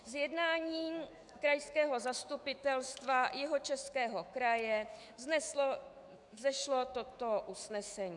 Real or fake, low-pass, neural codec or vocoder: fake; 10.8 kHz; autoencoder, 48 kHz, 128 numbers a frame, DAC-VAE, trained on Japanese speech